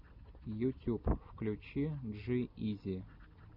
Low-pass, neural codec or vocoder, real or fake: 5.4 kHz; none; real